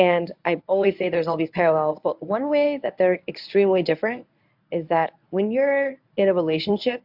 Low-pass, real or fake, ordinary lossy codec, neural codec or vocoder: 5.4 kHz; fake; AAC, 48 kbps; codec, 24 kHz, 0.9 kbps, WavTokenizer, medium speech release version 1